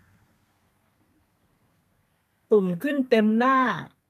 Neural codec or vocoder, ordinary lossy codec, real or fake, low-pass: codec, 32 kHz, 1.9 kbps, SNAC; none; fake; 14.4 kHz